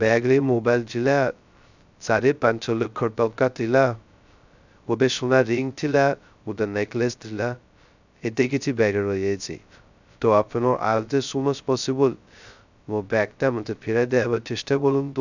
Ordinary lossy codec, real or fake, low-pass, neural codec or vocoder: none; fake; 7.2 kHz; codec, 16 kHz, 0.2 kbps, FocalCodec